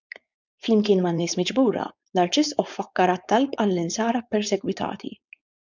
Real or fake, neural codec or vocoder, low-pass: fake; codec, 16 kHz, 4.8 kbps, FACodec; 7.2 kHz